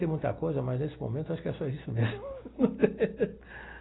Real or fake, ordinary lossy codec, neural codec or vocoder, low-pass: real; AAC, 16 kbps; none; 7.2 kHz